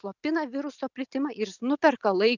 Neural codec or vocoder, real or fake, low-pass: none; real; 7.2 kHz